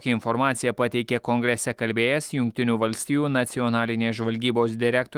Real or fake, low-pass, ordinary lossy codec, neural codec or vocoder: fake; 19.8 kHz; Opus, 24 kbps; autoencoder, 48 kHz, 128 numbers a frame, DAC-VAE, trained on Japanese speech